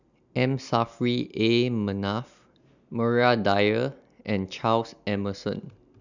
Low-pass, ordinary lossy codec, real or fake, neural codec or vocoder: 7.2 kHz; none; real; none